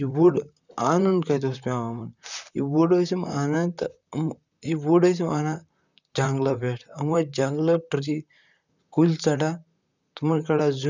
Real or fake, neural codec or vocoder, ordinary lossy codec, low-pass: fake; vocoder, 44.1 kHz, 128 mel bands, Pupu-Vocoder; none; 7.2 kHz